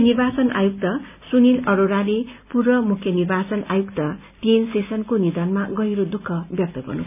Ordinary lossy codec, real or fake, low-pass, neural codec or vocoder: none; real; 3.6 kHz; none